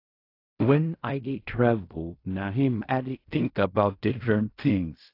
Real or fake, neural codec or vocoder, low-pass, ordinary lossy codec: fake; codec, 16 kHz in and 24 kHz out, 0.4 kbps, LongCat-Audio-Codec, fine tuned four codebook decoder; 5.4 kHz; AAC, 32 kbps